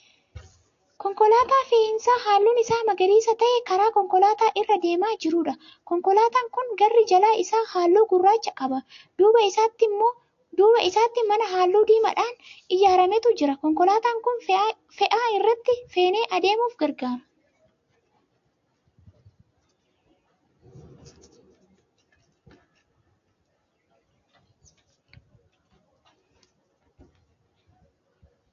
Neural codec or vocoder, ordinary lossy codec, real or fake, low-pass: none; AAC, 48 kbps; real; 7.2 kHz